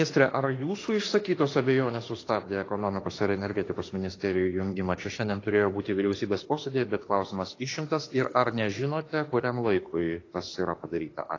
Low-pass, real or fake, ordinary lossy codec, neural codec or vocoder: 7.2 kHz; fake; AAC, 32 kbps; autoencoder, 48 kHz, 32 numbers a frame, DAC-VAE, trained on Japanese speech